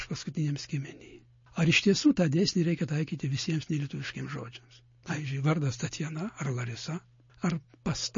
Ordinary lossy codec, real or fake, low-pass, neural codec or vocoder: MP3, 32 kbps; real; 7.2 kHz; none